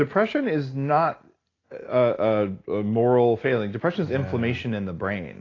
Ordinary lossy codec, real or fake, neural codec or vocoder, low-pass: AAC, 32 kbps; real; none; 7.2 kHz